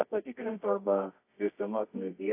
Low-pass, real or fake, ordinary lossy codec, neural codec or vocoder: 3.6 kHz; fake; AAC, 24 kbps; codec, 24 kHz, 0.9 kbps, WavTokenizer, medium music audio release